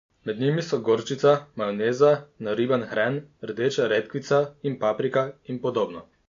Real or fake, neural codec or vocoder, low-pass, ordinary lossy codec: real; none; 7.2 kHz; MP3, 48 kbps